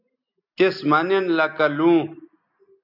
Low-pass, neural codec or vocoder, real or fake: 5.4 kHz; none; real